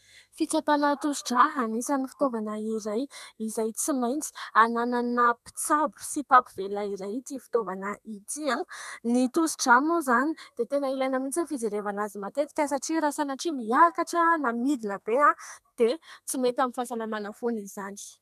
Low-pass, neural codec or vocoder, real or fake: 14.4 kHz; codec, 32 kHz, 1.9 kbps, SNAC; fake